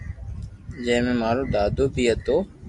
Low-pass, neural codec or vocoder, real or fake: 10.8 kHz; none; real